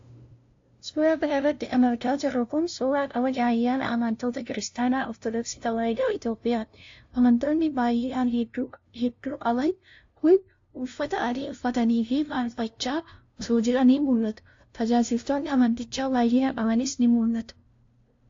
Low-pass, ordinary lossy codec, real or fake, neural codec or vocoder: 7.2 kHz; AAC, 48 kbps; fake; codec, 16 kHz, 0.5 kbps, FunCodec, trained on LibriTTS, 25 frames a second